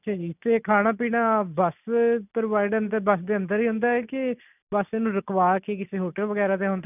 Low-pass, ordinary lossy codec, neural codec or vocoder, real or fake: 3.6 kHz; none; none; real